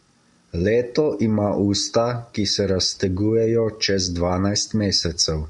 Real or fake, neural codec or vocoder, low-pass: real; none; 10.8 kHz